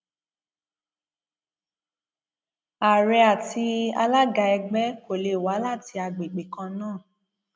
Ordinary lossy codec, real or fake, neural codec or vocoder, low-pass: none; real; none; none